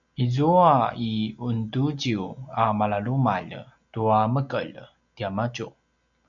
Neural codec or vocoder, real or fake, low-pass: none; real; 7.2 kHz